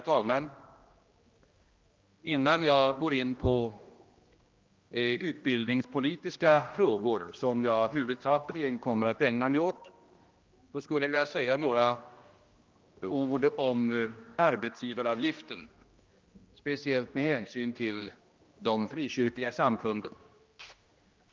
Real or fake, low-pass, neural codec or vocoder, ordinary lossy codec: fake; 7.2 kHz; codec, 16 kHz, 1 kbps, X-Codec, HuBERT features, trained on general audio; Opus, 32 kbps